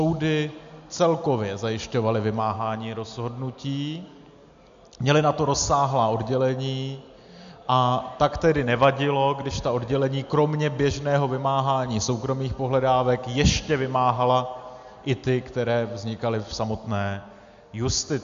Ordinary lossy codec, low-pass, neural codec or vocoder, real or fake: MP3, 64 kbps; 7.2 kHz; none; real